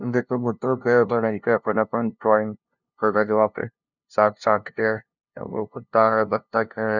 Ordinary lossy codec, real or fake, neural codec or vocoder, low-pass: none; fake; codec, 16 kHz, 0.5 kbps, FunCodec, trained on LibriTTS, 25 frames a second; 7.2 kHz